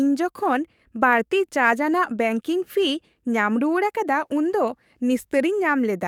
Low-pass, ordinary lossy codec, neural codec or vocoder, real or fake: 19.8 kHz; none; codec, 44.1 kHz, 7.8 kbps, DAC; fake